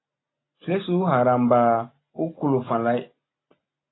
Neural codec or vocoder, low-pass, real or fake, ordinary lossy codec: none; 7.2 kHz; real; AAC, 16 kbps